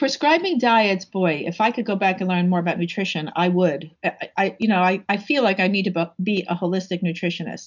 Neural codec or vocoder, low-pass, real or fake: none; 7.2 kHz; real